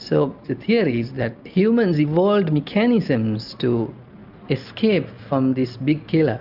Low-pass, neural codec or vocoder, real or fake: 5.4 kHz; none; real